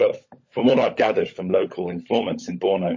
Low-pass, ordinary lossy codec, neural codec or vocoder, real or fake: 7.2 kHz; MP3, 32 kbps; codec, 16 kHz, 16 kbps, FunCodec, trained on LibriTTS, 50 frames a second; fake